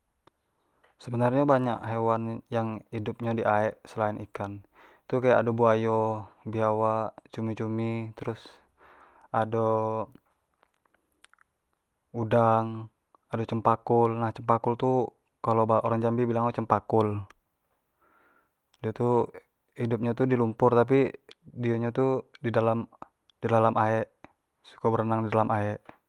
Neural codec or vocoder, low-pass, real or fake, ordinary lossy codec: none; 19.8 kHz; real; Opus, 24 kbps